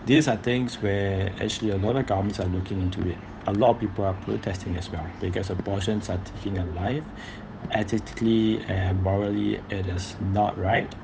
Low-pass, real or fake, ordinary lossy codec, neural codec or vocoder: none; fake; none; codec, 16 kHz, 8 kbps, FunCodec, trained on Chinese and English, 25 frames a second